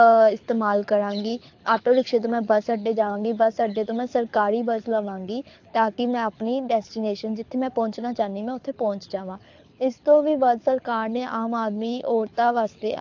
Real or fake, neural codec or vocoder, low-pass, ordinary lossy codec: fake; codec, 24 kHz, 6 kbps, HILCodec; 7.2 kHz; AAC, 48 kbps